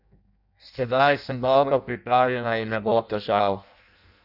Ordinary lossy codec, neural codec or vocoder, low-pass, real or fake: none; codec, 16 kHz in and 24 kHz out, 0.6 kbps, FireRedTTS-2 codec; 5.4 kHz; fake